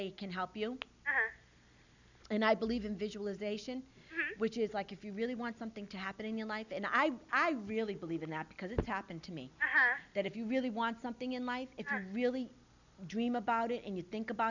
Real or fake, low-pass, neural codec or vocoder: real; 7.2 kHz; none